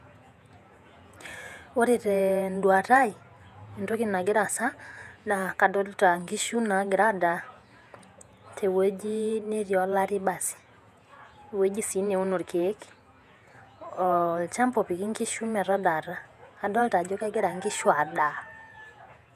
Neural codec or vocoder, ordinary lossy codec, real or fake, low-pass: vocoder, 48 kHz, 128 mel bands, Vocos; none; fake; 14.4 kHz